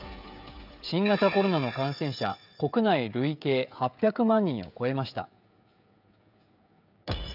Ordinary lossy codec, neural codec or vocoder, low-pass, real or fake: none; codec, 16 kHz, 16 kbps, FreqCodec, smaller model; 5.4 kHz; fake